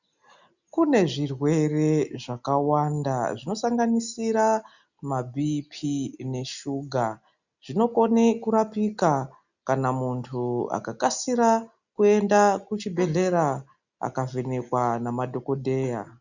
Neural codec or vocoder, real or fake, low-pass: none; real; 7.2 kHz